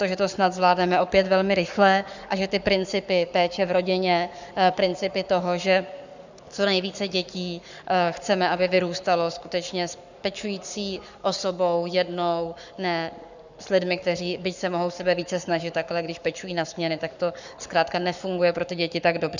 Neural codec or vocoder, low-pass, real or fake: codec, 44.1 kHz, 7.8 kbps, Pupu-Codec; 7.2 kHz; fake